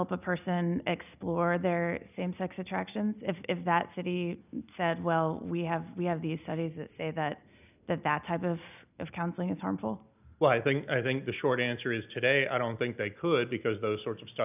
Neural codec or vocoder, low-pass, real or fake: none; 3.6 kHz; real